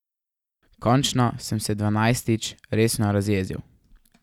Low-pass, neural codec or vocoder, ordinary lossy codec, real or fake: 19.8 kHz; none; none; real